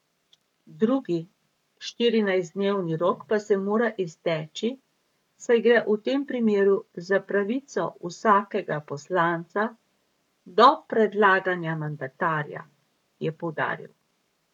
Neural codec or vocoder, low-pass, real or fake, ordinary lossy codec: codec, 44.1 kHz, 7.8 kbps, Pupu-Codec; 19.8 kHz; fake; none